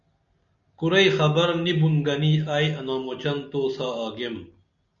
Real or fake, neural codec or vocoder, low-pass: real; none; 7.2 kHz